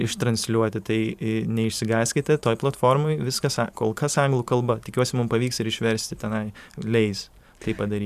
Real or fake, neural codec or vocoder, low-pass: fake; vocoder, 44.1 kHz, 128 mel bands every 512 samples, BigVGAN v2; 14.4 kHz